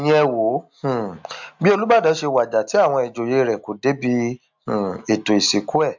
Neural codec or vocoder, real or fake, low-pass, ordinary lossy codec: none; real; 7.2 kHz; MP3, 64 kbps